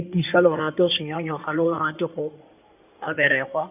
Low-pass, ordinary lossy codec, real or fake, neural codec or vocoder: 3.6 kHz; none; fake; codec, 16 kHz in and 24 kHz out, 2.2 kbps, FireRedTTS-2 codec